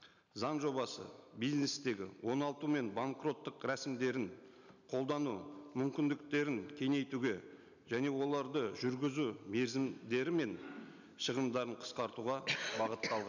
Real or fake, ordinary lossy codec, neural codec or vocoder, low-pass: real; none; none; 7.2 kHz